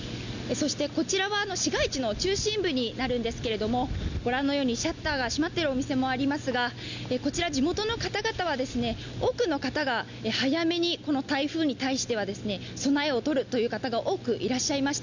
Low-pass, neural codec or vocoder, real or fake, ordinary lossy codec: 7.2 kHz; none; real; none